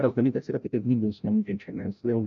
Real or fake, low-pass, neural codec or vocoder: fake; 7.2 kHz; codec, 16 kHz, 0.5 kbps, FreqCodec, larger model